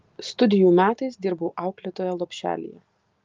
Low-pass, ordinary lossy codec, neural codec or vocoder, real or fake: 7.2 kHz; Opus, 24 kbps; none; real